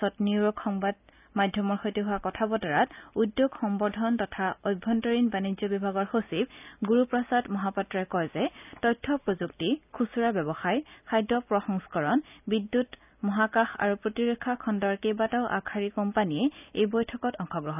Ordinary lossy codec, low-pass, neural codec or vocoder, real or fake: none; 3.6 kHz; none; real